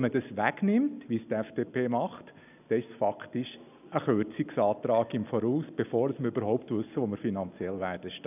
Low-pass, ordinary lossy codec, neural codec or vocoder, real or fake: 3.6 kHz; none; none; real